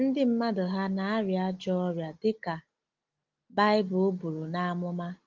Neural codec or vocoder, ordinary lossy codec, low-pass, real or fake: none; Opus, 32 kbps; 7.2 kHz; real